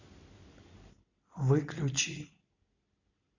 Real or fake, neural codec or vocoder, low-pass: real; none; 7.2 kHz